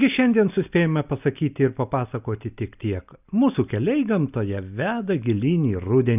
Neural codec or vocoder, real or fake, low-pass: none; real; 3.6 kHz